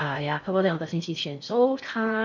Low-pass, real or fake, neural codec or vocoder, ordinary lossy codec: 7.2 kHz; fake; codec, 16 kHz in and 24 kHz out, 0.6 kbps, FocalCodec, streaming, 4096 codes; none